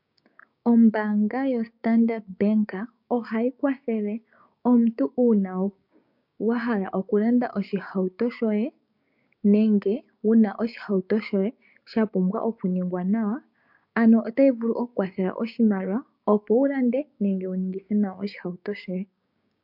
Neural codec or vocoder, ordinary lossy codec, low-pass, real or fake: codec, 16 kHz, 6 kbps, DAC; MP3, 48 kbps; 5.4 kHz; fake